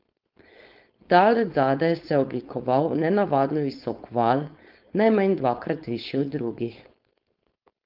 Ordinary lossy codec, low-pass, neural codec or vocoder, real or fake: Opus, 32 kbps; 5.4 kHz; codec, 16 kHz, 4.8 kbps, FACodec; fake